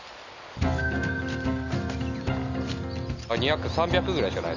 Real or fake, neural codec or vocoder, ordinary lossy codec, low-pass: real; none; none; 7.2 kHz